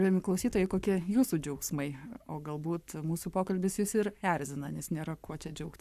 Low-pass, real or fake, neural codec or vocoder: 14.4 kHz; fake; codec, 44.1 kHz, 7.8 kbps, Pupu-Codec